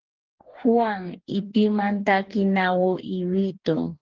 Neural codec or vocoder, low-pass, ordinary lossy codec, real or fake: codec, 44.1 kHz, 2.6 kbps, DAC; 7.2 kHz; Opus, 16 kbps; fake